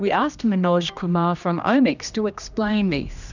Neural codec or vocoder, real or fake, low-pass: codec, 16 kHz, 1 kbps, X-Codec, HuBERT features, trained on general audio; fake; 7.2 kHz